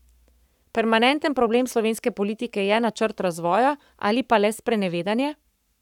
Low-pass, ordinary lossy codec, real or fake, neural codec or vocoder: 19.8 kHz; none; fake; codec, 44.1 kHz, 7.8 kbps, Pupu-Codec